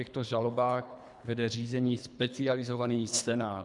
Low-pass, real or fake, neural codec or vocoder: 10.8 kHz; fake; codec, 24 kHz, 3 kbps, HILCodec